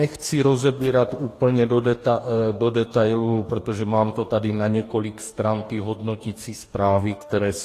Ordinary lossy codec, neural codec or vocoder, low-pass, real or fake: AAC, 48 kbps; codec, 44.1 kHz, 2.6 kbps, DAC; 14.4 kHz; fake